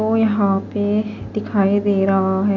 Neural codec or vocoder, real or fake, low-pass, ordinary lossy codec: none; real; 7.2 kHz; none